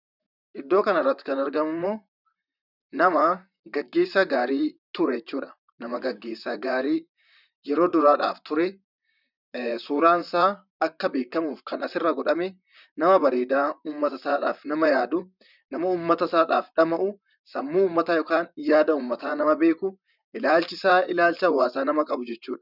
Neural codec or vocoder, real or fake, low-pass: vocoder, 44.1 kHz, 128 mel bands, Pupu-Vocoder; fake; 5.4 kHz